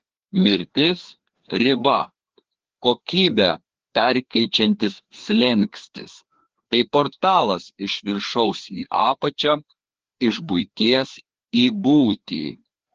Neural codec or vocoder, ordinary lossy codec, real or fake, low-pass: codec, 16 kHz, 2 kbps, FreqCodec, larger model; Opus, 16 kbps; fake; 7.2 kHz